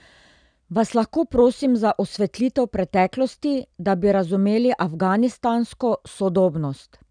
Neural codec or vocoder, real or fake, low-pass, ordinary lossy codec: none; real; 9.9 kHz; none